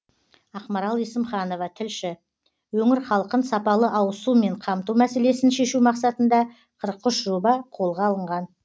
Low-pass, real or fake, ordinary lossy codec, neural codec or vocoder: none; real; none; none